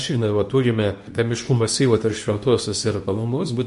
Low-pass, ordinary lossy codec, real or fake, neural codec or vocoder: 10.8 kHz; MP3, 48 kbps; fake; codec, 24 kHz, 0.9 kbps, WavTokenizer, medium speech release version 2